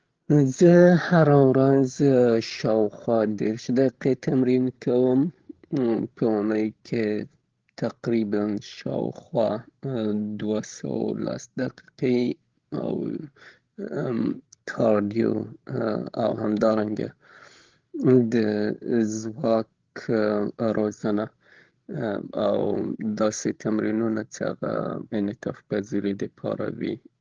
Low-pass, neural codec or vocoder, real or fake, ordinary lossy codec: 7.2 kHz; codec, 16 kHz, 16 kbps, FreqCodec, larger model; fake; Opus, 16 kbps